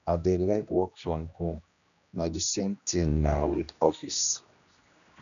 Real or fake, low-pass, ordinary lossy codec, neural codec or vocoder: fake; 7.2 kHz; none; codec, 16 kHz, 1 kbps, X-Codec, HuBERT features, trained on general audio